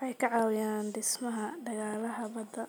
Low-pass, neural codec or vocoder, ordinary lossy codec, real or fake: none; none; none; real